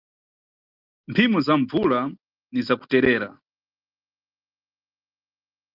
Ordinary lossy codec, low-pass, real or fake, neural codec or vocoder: Opus, 24 kbps; 5.4 kHz; real; none